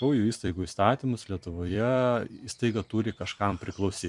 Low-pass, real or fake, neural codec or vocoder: 10.8 kHz; fake; vocoder, 44.1 kHz, 128 mel bands every 256 samples, BigVGAN v2